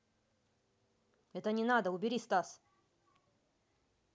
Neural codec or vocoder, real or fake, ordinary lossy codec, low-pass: none; real; none; none